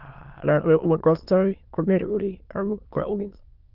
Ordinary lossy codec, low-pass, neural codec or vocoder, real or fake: Opus, 32 kbps; 5.4 kHz; autoencoder, 22.05 kHz, a latent of 192 numbers a frame, VITS, trained on many speakers; fake